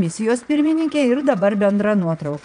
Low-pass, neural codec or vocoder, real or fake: 9.9 kHz; vocoder, 22.05 kHz, 80 mel bands, Vocos; fake